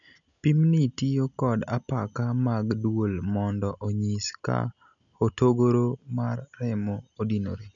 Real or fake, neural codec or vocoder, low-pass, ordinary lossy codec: real; none; 7.2 kHz; none